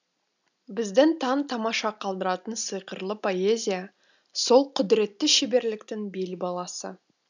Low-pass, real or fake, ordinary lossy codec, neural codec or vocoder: 7.2 kHz; real; none; none